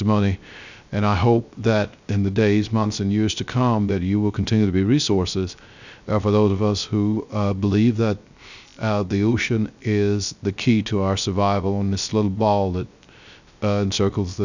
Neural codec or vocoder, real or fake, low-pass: codec, 16 kHz, 0.3 kbps, FocalCodec; fake; 7.2 kHz